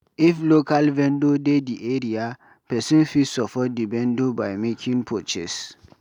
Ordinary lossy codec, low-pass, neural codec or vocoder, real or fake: none; 19.8 kHz; none; real